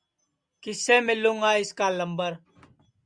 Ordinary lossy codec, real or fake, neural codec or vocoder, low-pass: Opus, 64 kbps; real; none; 9.9 kHz